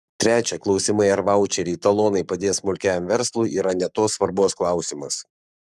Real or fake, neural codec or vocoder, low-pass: fake; codec, 44.1 kHz, 7.8 kbps, Pupu-Codec; 14.4 kHz